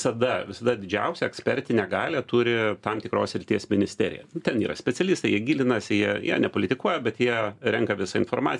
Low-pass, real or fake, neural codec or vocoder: 10.8 kHz; real; none